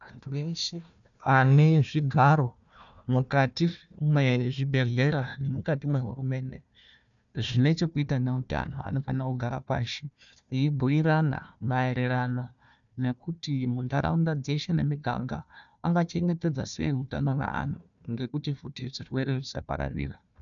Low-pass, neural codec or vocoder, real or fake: 7.2 kHz; codec, 16 kHz, 1 kbps, FunCodec, trained on Chinese and English, 50 frames a second; fake